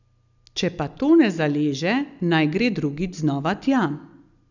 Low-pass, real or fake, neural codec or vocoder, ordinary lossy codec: 7.2 kHz; real; none; none